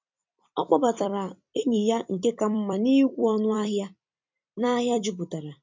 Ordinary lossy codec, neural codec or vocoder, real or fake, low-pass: MP3, 64 kbps; vocoder, 44.1 kHz, 128 mel bands every 256 samples, BigVGAN v2; fake; 7.2 kHz